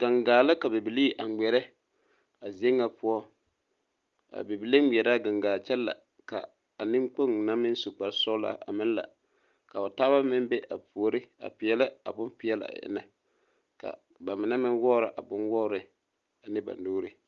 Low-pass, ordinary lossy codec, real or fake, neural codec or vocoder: 7.2 kHz; Opus, 32 kbps; real; none